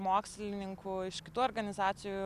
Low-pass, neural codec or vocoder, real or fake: 14.4 kHz; none; real